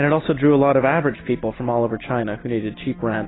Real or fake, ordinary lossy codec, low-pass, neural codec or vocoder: real; AAC, 16 kbps; 7.2 kHz; none